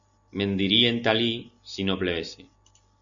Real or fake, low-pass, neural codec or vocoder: real; 7.2 kHz; none